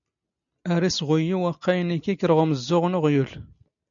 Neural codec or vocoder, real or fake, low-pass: none; real; 7.2 kHz